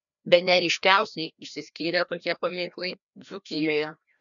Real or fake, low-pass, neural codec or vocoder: fake; 7.2 kHz; codec, 16 kHz, 1 kbps, FreqCodec, larger model